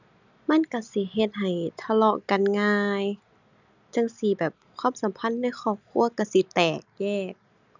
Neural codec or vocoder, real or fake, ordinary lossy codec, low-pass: none; real; none; 7.2 kHz